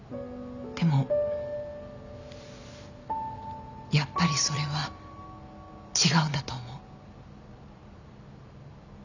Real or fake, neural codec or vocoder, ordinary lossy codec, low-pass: real; none; none; 7.2 kHz